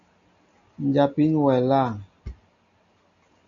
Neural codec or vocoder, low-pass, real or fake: none; 7.2 kHz; real